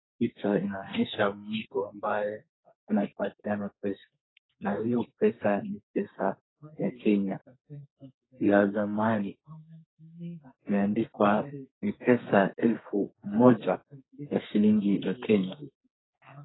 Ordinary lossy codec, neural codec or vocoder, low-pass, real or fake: AAC, 16 kbps; codec, 32 kHz, 1.9 kbps, SNAC; 7.2 kHz; fake